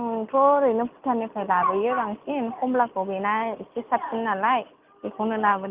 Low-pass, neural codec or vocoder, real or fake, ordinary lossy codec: 3.6 kHz; none; real; Opus, 32 kbps